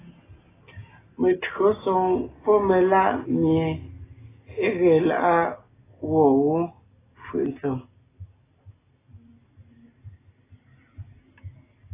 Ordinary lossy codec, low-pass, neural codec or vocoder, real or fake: AAC, 16 kbps; 3.6 kHz; none; real